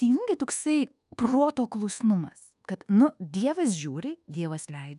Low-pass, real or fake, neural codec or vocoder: 10.8 kHz; fake; codec, 24 kHz, 1.2 kbps, DualCodec